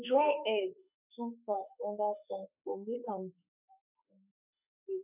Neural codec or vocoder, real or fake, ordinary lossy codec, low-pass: autoencoder, 48 kHz, 32 numbers a frame, DAC-VAE, trained on Japanese speech; fake; none; 3.6 kHz